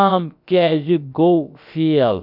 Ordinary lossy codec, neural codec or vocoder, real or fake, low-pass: none; codec, 16 kHz, about 1 kbps, DyCAST, with the encoder's durations; fake; 5.4 kHz